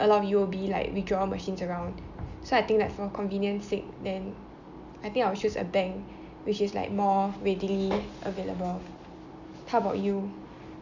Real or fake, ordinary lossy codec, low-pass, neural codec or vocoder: real; none; 7.2 kHz; none